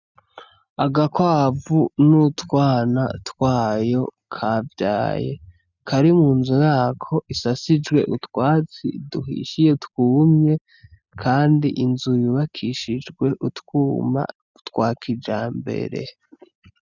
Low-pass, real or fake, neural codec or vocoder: 7.2 kHz; real; none